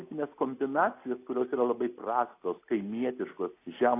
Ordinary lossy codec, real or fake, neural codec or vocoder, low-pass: AAC, 24 kbps; real; none; 3.6 kHz